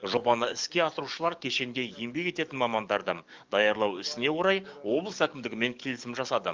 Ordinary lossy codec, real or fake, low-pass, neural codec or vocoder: Opus, 24 kbps; fake; 7.2 kHz; codec, 44.1 kHz, 7.8 kbps, DAC